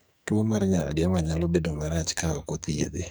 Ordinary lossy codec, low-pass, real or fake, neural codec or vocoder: none; none; fake; codec, 44.1 kHz, 2.6 kbps, SNAC